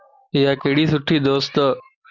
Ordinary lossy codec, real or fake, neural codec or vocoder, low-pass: Opus, 64 kbps; real; none; 7.2 kHz